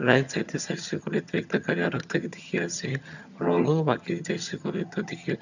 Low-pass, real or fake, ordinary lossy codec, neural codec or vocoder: 7.2 kHz; fake; none; vocoder, 22.05 kHz, 80 mel bands, HiFi-GAN